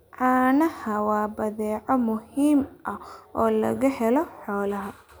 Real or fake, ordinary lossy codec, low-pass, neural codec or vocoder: real; none; none; none